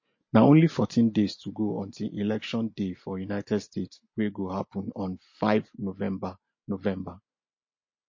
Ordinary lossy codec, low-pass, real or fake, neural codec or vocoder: MP3, 32 kbps; 7.2 kHz; real; none